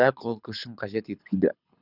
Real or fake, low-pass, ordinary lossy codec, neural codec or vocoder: fake; 5.4 kHz; none; codec, 24 kHz, 6 kbps, HILCodec